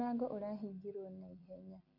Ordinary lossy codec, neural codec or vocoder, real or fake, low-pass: none; none; real; 5.4 kHz